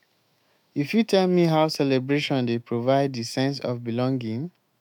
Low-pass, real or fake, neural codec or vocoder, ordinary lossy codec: 19.8 kHz; fake; autoencoder, 48 kHz, 128 numbers a frame, DAC-VAE, trained on Japanese speech; MP3, 96 kbps